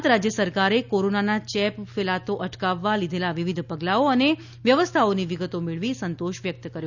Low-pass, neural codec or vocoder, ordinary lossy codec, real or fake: 7.2 kHz; none; none; real